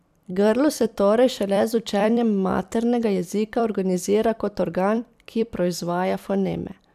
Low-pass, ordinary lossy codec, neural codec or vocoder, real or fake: 14.4 kHz; none; vocoder, 44.1 kHz, 128 mel bands every 256 samples, BigVGAN v2; fake